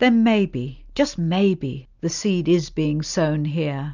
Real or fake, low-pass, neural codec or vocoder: real; 7.2 kHz; none